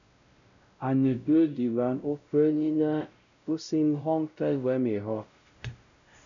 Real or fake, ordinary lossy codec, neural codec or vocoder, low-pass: fake; MP3, 96 kbps; codec, 16 kHz, 0.5 kbps, X-Codec, WavLM features, trained on Multilingual LibriSpeech; 7.2 kHz